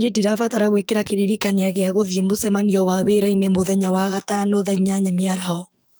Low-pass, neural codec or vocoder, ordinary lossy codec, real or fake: none; codec, 44.1 kHz, 2.6 kbps, SNAC; none; fake